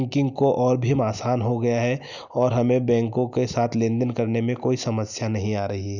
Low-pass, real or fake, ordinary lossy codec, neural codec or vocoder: 7.2 kHz; real; none; none